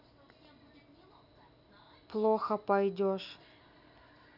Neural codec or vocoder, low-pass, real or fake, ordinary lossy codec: none; 5.4 kHz; real; none